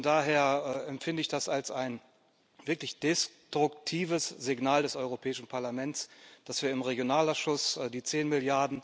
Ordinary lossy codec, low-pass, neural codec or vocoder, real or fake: none; none; none; real